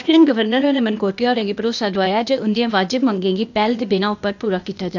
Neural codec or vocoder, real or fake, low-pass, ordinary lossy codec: codec, 16 kHz, 0.8 kbps, ZipCodec; fake; 7.2 kHz; none